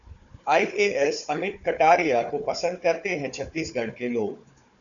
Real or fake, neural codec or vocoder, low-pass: fake; codec, 16 kHz, 4 kbps, FunCodec, trained on Chinese and English, 50 frames a second; 7.2 kHz